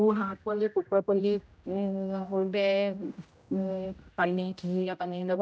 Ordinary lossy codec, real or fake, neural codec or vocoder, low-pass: none; fake; codec, 16 kHz, 0.5 kbps, X-Codec, HuBERT features, trained on general audio; none